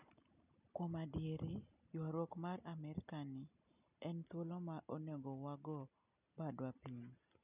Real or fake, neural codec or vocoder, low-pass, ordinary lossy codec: real; none; 3.6 kHz; none